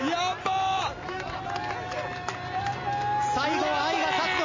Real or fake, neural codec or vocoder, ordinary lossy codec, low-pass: real; none; MP3, 32 kbps; 7.2 kHz